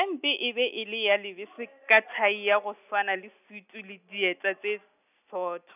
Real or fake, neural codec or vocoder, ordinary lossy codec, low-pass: real; none; none; 3.6 kHz